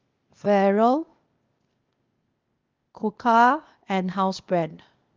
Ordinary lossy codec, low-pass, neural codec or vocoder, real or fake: Opus, 32 kbps; 7.2 kHz; codec, 16 kHz, 0.8 kbps, ZipCodec; fake